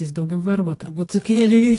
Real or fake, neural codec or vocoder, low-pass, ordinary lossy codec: fake; codec, 24 kHz, 0.9 kbps, WavTokenizer, medium music audio release; 10.8 kHz; AAC, 48 kbps